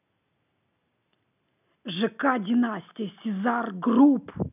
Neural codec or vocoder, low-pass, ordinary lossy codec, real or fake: none; 3.6 kHz; none; real